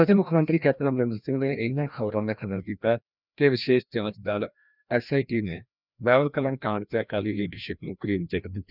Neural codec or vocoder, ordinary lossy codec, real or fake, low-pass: codec, 16 kHz, 1 kbps, FreqCodec, larger model; none; fake; 5.4 kHz